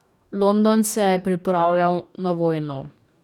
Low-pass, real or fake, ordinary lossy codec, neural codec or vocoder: 19.8 kHz; fake; none; codec, 44.1 kHz, 2.6 kbps, DAC